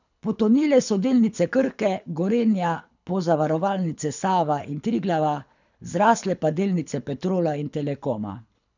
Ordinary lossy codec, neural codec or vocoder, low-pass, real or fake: none; codec, 24 kHz, 6 kbps, HILCodec; 7.2 kHz; fake